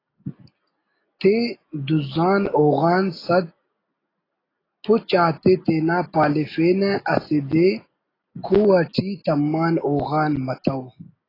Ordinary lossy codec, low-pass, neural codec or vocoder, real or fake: AAC, 24 kbps; 5.4 kHz; none; real